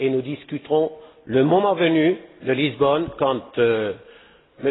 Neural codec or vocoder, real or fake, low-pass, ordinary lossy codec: none; real; 7.2 kHz; AAC, 16 kbps